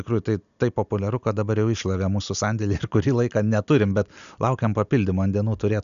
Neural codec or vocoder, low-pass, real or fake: none; 7.2 kHz; real